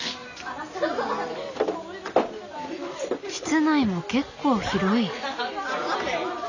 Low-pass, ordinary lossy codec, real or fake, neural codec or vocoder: 7.2 kHz; none; real; none